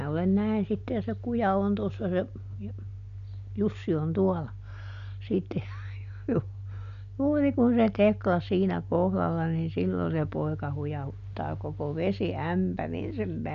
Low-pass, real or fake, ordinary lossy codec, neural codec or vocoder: 7.2 kHz; real; none; none